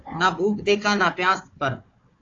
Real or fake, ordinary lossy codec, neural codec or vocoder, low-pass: fake; AAC, 32 kbps; codec, 16 kHz, 4 kbps, FunCodec, trained on Chinese and English, 50 frames a second; 7.2 kHz